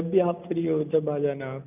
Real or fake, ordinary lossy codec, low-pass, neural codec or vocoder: real; none; 3.6 kHz; none